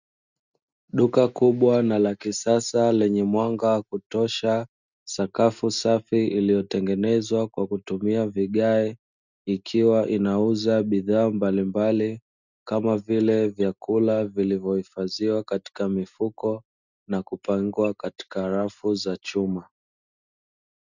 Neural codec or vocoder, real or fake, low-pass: none; real; 7.2 kHz